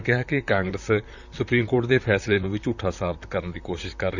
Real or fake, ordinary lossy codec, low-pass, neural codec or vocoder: fake; none; 7.2 kHz; vocoder, 44.1 kHz, 128 mel bands, Pupu-Vocoder